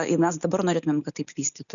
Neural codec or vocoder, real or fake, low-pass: none; real; 7.2 kHz